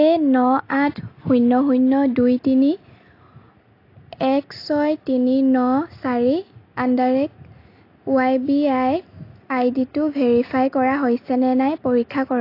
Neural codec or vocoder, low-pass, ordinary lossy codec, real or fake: none; 5.4 kHz; AAC, 32 kbps; real